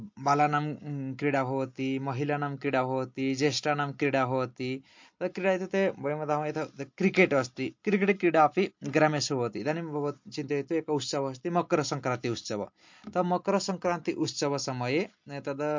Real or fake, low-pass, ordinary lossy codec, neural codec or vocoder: real; 7.2 kHz; MP3, 48 kbps; none